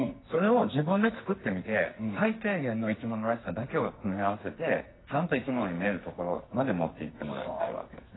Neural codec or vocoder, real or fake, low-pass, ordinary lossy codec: codec, 44.1 kHz, 2.6 kbps, SNAC; fake; 7.2 kHz; AAC, 16 kbps